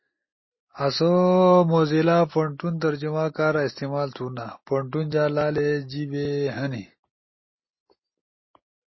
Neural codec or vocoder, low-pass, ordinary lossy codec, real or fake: none; 7.2 kHz; MP3, 24 kbps; real